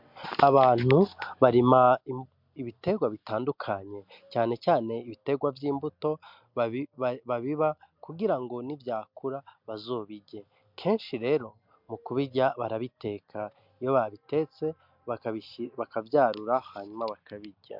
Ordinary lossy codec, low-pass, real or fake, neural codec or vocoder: MP3, 48 kbps; 5.4 kHz; real; none